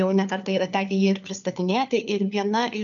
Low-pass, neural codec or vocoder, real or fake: 7.2 kHz; codec, 16 kHz, 4 kbps, FunCodec, trained on LibriTTS, 50 frames a second; fake